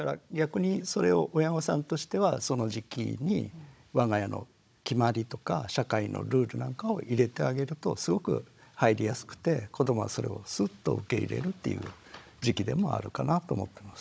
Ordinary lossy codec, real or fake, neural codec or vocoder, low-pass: none; fake; codec, 16 kHz, 16 kbps, FunCodec, trained on Chinese and English, 50 frames a second; none